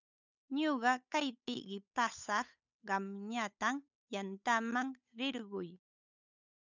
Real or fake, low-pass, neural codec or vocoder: fake; 7.2 kHz; codec, 16 kHz, 8 kbps, FunCodec, trained on Chinese and English, 25 frames a second